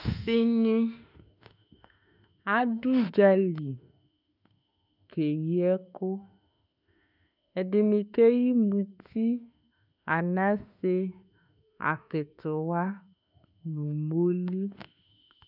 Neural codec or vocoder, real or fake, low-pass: autoencoder, 48 kHz, 32 numbers a frame, DAC-VAE, trained on Japanese speech; fake; 5.4 kHz